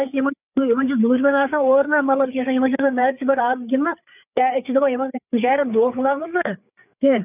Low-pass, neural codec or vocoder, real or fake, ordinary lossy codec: 3.6 kHz; codec, 16 kHz, 4 kbps, FreqCodec, larger model; fake; none